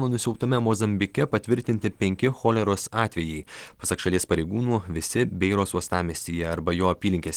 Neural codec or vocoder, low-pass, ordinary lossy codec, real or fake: none; 19.8 kHz; Opus, 16 kbps; real